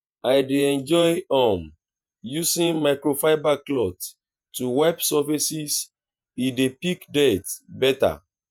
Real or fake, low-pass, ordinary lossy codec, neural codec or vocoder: fake; none; none; vocoder, 48 kHz, 128 mel bands, Vocos